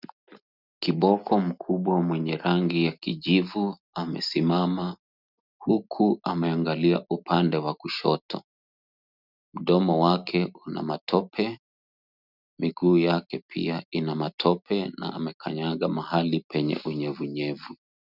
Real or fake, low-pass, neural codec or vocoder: real; 5.4 kHz; none